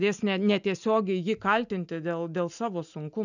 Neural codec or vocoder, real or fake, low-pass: none; real; 7.2 kHz